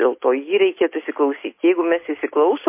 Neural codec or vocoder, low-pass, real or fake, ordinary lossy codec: none; 3.6 kHz; real; MP3, 24 kbps